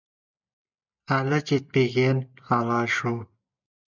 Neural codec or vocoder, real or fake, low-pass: none; real; 7.2 kHz